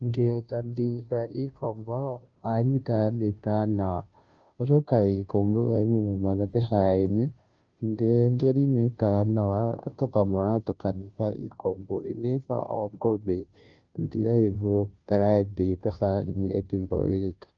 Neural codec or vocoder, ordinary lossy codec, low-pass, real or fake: codec, 16 kHz, 0.5 kbps, FunCodec, trained on Chinese and English, 25 frames a second; Opus, 24 kbps; 7.2 kHz; fake